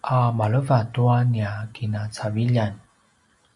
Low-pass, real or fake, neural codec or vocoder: 10.8 kHz; real; none